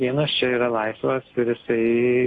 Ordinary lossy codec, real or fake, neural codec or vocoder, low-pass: AAC, 32 kbps; real; none; 10.8 kHz